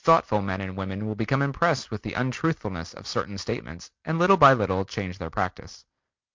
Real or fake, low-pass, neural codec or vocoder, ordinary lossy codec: real; 7.2 kHz; none; MP3, 64 kbps